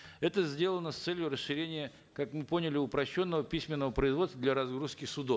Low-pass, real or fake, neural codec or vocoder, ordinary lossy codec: none; real; none; none